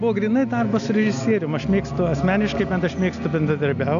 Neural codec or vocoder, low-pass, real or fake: none; 7.2 kHz; real